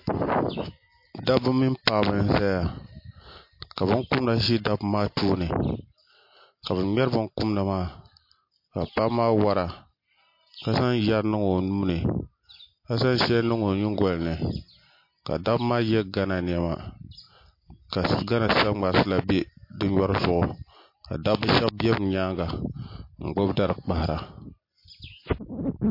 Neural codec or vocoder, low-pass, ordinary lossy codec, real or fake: none; 5.4 kHz; MP3, 32 kbps; real